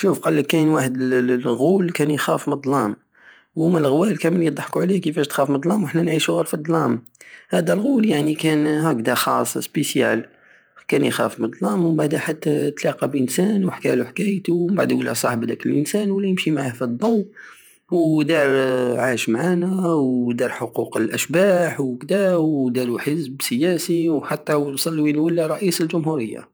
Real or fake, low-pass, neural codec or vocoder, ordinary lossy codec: fake; none; vocoder, 48 kHz, 128 mel bands, Vocos; none